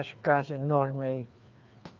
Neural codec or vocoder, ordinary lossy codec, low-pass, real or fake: codec, 16 kHz, 2 kbps, FreqCodec, larger model; Opus, 24 kbps; 7.2 kHz; fake